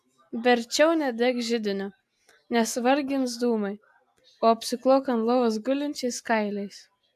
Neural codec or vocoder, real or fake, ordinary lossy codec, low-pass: none; real; AAC, 96 kbps; 14.4 kHz